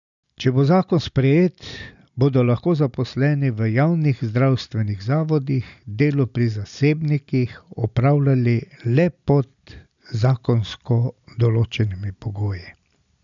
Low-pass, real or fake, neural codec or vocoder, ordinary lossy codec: 7.2 kHz; real; none; none